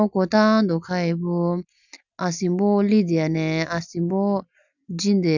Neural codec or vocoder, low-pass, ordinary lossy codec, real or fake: none; 7.2 kHz; none; real